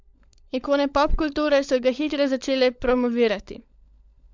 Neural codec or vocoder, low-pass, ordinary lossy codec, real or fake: codec, 16 kHz, 16 kbps, FunCodec, trained on LibriTTS, 50 frames a second; 7.2 kHz; MP3, 64 kbps; fake